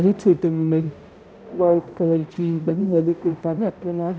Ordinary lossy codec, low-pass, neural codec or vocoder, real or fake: none; none; codec, 16 kHz, 0.5 kbps, X-Codec, HuBERT features, trained on balanced general audio; fake